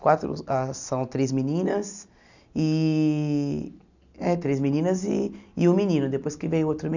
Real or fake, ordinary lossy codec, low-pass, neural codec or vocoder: real; none; 7.2 kHz; none